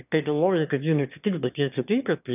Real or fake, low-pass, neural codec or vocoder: fake; 3.6 kHz; autoencoder, 22.05 kHz, a latent of 192 numbers a frame, VITS, trained on one speaker